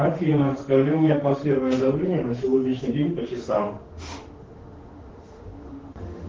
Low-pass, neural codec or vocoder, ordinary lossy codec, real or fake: 7.2 kHz; autoencoder, 48 kHz, 32 numbers a frame, DAC-VAE, trained on Japanese speech; Opus, 16 kbps; fake